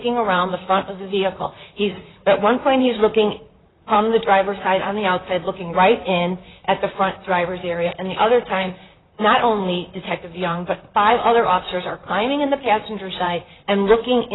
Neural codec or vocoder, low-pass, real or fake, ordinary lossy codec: vocoder, 44.1 kHz, 128 mel bands, Pupu-Vocoder; 7.2 kHz; fake; AAC, 16 kbps